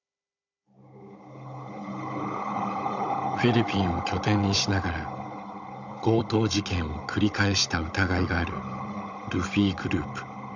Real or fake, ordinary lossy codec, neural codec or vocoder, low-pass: fake; none; codec, 16 kHz, 16 kbps, FunCodec, trained on Chinese and English, 50 frames a second; 7.2 kHz